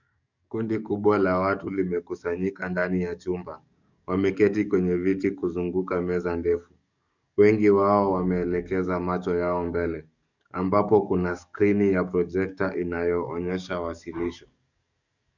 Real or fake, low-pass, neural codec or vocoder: fake; 7.2 kHz; codec, 44.1 kHz, 7.8 kbps, DAC